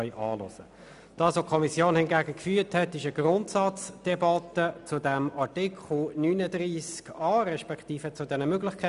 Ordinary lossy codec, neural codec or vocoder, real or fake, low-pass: none; none; real; 10.8 kHz